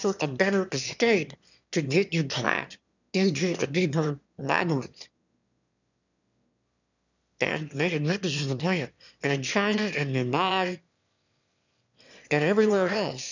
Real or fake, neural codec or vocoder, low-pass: fake; autoencoder, 22.05 kHz, a latent of 192 numbers a frame, VITS, trained on one speaker; 7.2 kHz